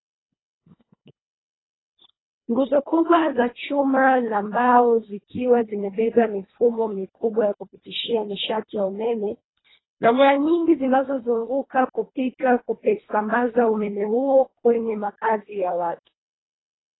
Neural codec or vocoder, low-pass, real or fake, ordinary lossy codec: codec, 24 kHz, 1.5 kbps, HILCodec; 7.2 kHz; fake; AAC, 16 kbps